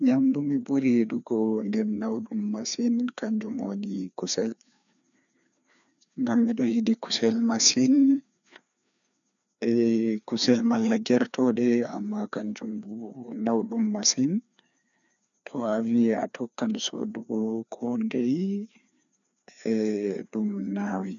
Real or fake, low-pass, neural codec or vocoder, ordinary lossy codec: fake; 7.2 kHz; codec, 16 kHz, 2 kbps, FreqCodec, larger model; none